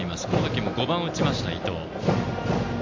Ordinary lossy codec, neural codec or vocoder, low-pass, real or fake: MP3, 64 kbps; none; 7.2 kHz; real